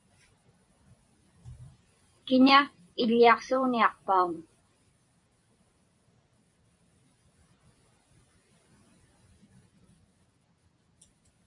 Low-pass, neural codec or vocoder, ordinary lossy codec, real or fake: 10.8 kHz; vocoder, 44.1 kHz, 128 mel bands every 256 samples, BigVGAN v2; MP3, 96 kbps; fake